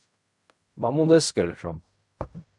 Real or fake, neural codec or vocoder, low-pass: fake; codec, 16 kHz in and 24 kHz out, 0.4 kbps, LongCat-Audio-Codec, fine tuned four codebook decoder; 10.8 kHz